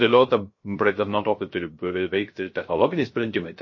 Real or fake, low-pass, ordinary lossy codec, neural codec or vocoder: fake; 7.2 kHz; MP3, 32 kbps; codec, 16 kHz, 0.3 kbps, FocalCodec